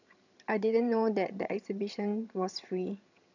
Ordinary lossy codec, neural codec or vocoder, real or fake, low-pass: none; vocoder, 22.05 kHz, 80 mel bands, HiFi-GAN; fake; 7.2 kHz